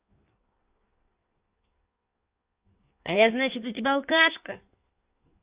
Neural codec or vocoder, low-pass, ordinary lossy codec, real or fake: codec, 16 kHz in and 24 kHz out, 1.1 kbps, FireRedTTS-2 codec; 3.6 kHz; Opus, 64 kbps; fake